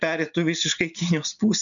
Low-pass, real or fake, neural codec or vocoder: 7.2 kHz; real; none